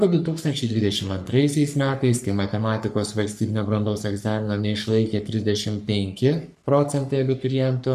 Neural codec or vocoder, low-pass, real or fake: codec, 44.1 kHz, 3.4 kbps, Pupu-Codec; 14.4 kHz; fake